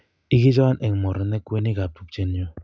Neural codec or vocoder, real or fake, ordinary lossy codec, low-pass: none; real; none; none